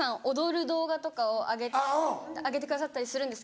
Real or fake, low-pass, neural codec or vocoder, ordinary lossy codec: real; none; none; none